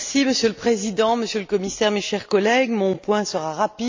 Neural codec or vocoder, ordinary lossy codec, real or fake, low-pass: none; MP3, 64 kbps; real; 7.2 kHz